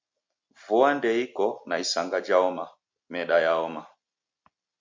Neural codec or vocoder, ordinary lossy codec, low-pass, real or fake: none; MP3, 48 kbps; 7.2 kHz; real